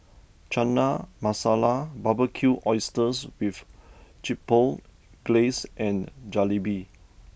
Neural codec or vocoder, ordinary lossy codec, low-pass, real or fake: none; none; none; real